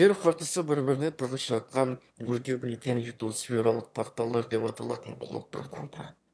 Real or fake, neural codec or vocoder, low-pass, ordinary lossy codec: fake; autoencoder, 22.05 kHz, a latent of 192 numbers a frame, VITS, trained on one speaker; none; none